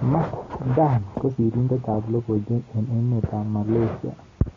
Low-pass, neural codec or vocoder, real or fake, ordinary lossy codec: 7.2 kHz; none; real; AAC, 32 kbps